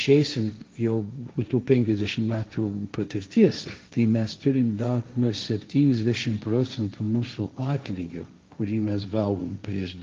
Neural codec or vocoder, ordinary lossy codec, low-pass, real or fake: codec, 16 kHz, 1.1 kbps, Voila-Tokenizer; Opus, 32 kbps; 7.2 kHz; fake